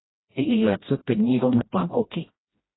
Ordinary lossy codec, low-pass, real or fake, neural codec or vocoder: AAC, 16 kbps; 7.2 kHz; fake; codec, 16 kHz, 1 kbps, FreqCodec, smaller model